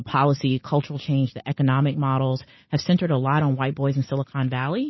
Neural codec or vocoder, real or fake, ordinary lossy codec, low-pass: none; real; MP3, 24 kbps; 7.2 kHz